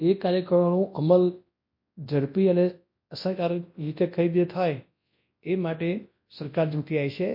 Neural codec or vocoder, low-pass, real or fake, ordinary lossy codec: codec, 24 kHz, 0.9 kbps, WavTokenizer, large speech release; 5.4 kHz; fake; MP3, 32 kbps